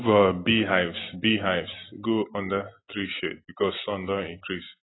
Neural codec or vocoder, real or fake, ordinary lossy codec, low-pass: none; real; AAC, 16 kbps; 7.2 kHz